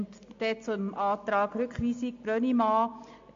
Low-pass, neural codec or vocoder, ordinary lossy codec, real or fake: 7.2 kHz; none; MP3, 48 kbps; real